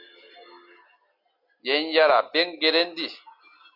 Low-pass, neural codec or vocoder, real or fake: 5.4 kHz; none; real